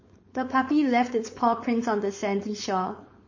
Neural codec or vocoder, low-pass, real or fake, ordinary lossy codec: codec, 16 kHz, 4.8 kbps, FACodec; 7.2 kHz; fake; MP3, 32 kbps